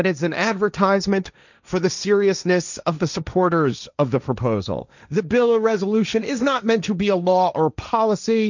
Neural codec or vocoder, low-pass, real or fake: codec, 16 kHz, 1.1 kbps, Voila-Tokenizer; 7.2 kHz; fake